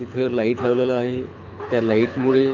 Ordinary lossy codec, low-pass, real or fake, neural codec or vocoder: AAC, 48 kbps; 7.2 kHz; fake; codec, 24 kHz, 6 kbps, HILCodec